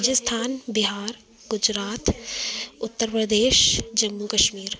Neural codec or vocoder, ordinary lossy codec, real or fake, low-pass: none; none; real; none